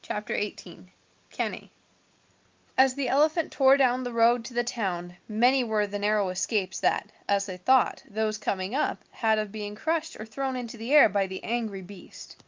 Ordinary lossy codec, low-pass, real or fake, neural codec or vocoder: Opus, 32 kbps; 7.2 kHz; real; none